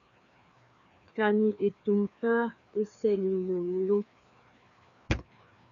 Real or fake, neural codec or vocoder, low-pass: fake; codec, 16 kHz, 2 kbps, FreqCodec, larger model; 7.2 kHz